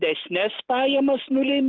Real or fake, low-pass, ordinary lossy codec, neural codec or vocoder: real; 7.2 kHz; Opus, 16 kbps; none